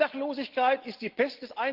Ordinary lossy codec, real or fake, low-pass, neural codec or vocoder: Opus, 16 kbps; real; 5.4 kHz; none